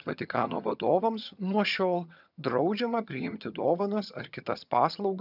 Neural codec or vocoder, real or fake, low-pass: vocoder, 22.05 kHz, 80 mel bands, HiFi-GAN; fake; 5.4 kHz